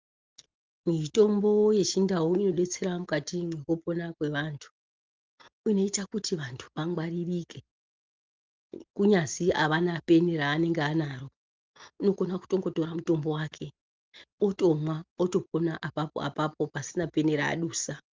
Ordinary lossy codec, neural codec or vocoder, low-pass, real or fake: Opus, 32 kbps; none; 7.2 kHz; real